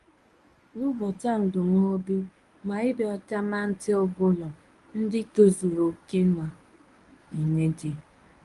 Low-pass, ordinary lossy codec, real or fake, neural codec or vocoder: 10.8 kHz; Opus, 32 kbps; fake; codec, 24 kHz, 0.9 kbps, WavTokenizer, medium speech release version 1